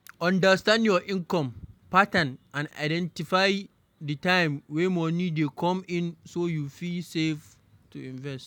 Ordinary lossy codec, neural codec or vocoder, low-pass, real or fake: none; none; 19.8 kHz; real